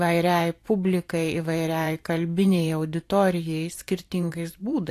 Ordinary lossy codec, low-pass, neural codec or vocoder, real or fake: AAC, 64 kbps; 14.4 kHz; vocoder, 44.1 kHz, 128 mel bands every 512 samples, BigVGAN v2; fake